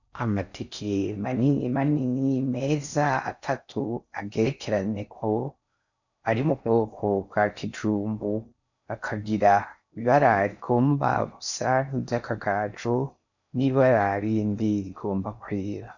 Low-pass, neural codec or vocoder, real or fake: 7.2 kHz; codec, 16 kHz in and 24 kHz out, 0.6 kbps, FocalCodec, streaming, 4096 codes; fake